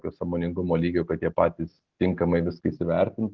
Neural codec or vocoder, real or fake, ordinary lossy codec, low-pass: none; real; Opus, 16 kbps; 7.2 kHz